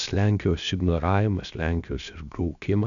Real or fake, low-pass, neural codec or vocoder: fake; 7.2 kHz; codec, 16 kHz, 0.7 kbps, FocalCodec